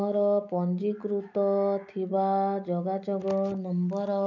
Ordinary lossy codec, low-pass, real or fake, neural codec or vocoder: none; 7.2 kHz; real; none